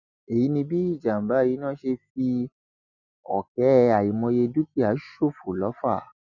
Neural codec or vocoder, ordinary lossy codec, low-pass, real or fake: none; none; 7.2 kHz; real